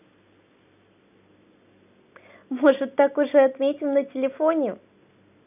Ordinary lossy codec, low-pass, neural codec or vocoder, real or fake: none; 3.6 kHz; none; real